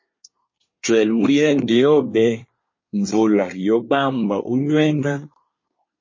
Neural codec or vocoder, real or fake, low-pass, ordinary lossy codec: codec, 24 kHz, 1 kbps, SNAC; fake; 7.2 kHz; MP3, 32 kbps